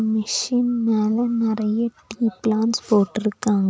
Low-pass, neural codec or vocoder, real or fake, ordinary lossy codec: none; none; real; none